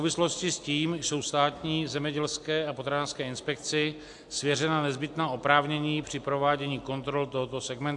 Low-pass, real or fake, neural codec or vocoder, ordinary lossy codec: 10.8 kHz; real; none; AAC, 64 kbps